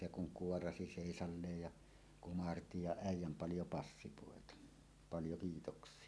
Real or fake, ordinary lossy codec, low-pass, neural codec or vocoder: real; none; none; none